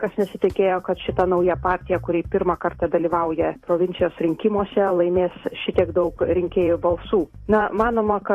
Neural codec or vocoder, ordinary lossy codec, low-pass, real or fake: vocoder, 44.1 kHz, 128 mel bands every 512 samples, BigVGAN v2; AAC, 48 kbps; 14.4 kHz; fake